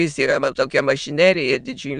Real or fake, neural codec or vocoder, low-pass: fake; autoencoder, 22.05 kHz, a latent of 192 numbers a frame, VITS, trained on many speakers; 9.9 kHz